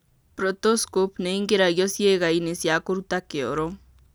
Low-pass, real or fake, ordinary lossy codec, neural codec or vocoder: none; real; none; none